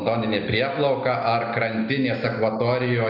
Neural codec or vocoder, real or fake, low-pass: none; real; 5.4 kHz